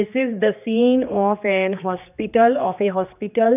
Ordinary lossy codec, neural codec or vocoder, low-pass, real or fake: none; codec, 16 kHz, 2 kbps, X-Codec, HuBERT features, trained on general audio; 3.6 kHz; fake